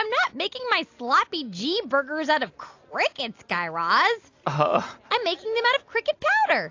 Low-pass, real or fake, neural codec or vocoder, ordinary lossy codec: 7.2 kHz; real; none; AAC, 48 kbps